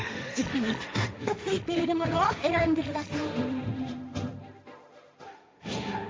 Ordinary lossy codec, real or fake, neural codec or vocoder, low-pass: none; fake; codec, 16 kHz, 1.1 kbps, Voila-Tokenizer; none